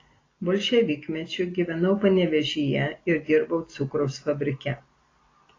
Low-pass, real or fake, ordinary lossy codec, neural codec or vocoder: 7.2 kHz; real; AAC, 32 kbps; none